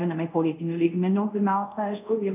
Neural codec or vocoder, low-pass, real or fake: codec, 24 kHz, 0.5 kbps, DualCodec; 3.6 kHz; fake